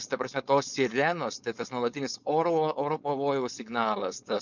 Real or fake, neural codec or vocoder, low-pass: fake; codec, 16 kHz, 4.8 kbps, FACodec; 7.2 kHz